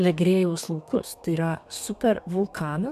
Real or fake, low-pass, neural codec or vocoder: fake; 14.4 kHz; codec, 32 kHz, 1.9 kbps, SNAC